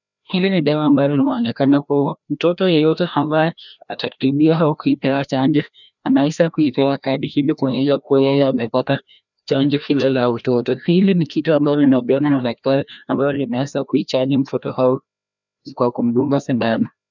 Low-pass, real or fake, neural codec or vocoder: 7.2 kHz; fake; codec, 16 kHz, 1 kbps, FreqCodec, larger model